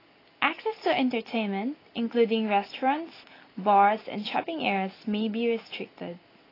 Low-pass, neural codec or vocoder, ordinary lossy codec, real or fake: 5.4 kHz; none; AAC, 24 kbps; real